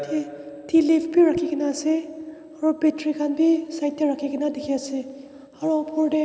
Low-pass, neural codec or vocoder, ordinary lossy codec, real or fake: none; none; none; real